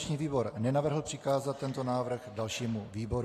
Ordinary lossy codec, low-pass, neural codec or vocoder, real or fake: AAC, 48 kbps; 14.4 kHz; none; real